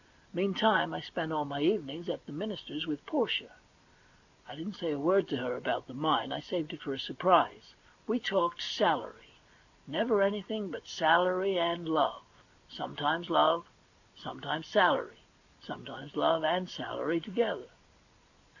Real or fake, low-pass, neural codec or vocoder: real; 7.2 kHz; none